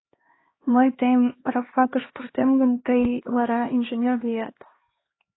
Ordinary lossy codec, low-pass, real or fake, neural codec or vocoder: AAC, 16 kbps; 7.2 kHz; fake; codec, 16 kHz, 2 kbps, X-Codec, HuBERT features, trained on LibriSpeech